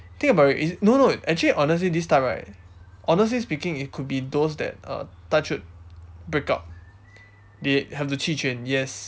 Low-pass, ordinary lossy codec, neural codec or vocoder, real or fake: none; none; none; real